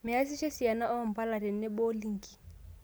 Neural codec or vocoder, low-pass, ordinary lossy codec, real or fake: none; none; none; real